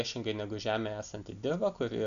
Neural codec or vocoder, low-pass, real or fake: none; 7.2 kHz; real